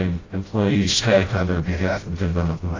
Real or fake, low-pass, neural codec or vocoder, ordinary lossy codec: fake; 7.2 kHz; codec, 16 kHz, 0.5 kbps, FreqCodec, smaller model; AAC, 32 kbps